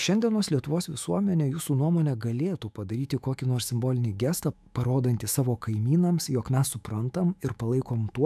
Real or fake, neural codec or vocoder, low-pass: fake; autoencoder, 48 kHz, 128 numbers a frame, DAC-VAE, trained on Japanese speech; 14.4 kHz